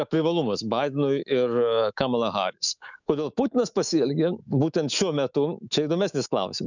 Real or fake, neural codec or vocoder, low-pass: fake; autoencoder, 48 kHz, 128 numbers a frame, DAC-VAE, trained on Japanese speech; 7.2 kHz